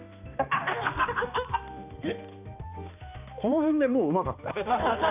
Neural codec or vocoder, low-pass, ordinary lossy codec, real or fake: codec, 16 kHz, 2 kbps, X-Codec, HuBERT features, trained on balanced general audio; 3.6 kHz; none; fake